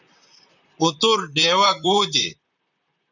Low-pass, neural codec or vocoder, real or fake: 7.2 kHz; vocoder, 44.1 kHz, 128 mel bands, Pupu-Vocoder; fake